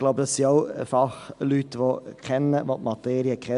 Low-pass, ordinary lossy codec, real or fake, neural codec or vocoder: 10.8 kHz; MP3, 96 kbps; real; none